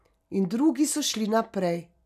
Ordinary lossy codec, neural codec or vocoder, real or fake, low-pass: none; none; real; 14.4 kHz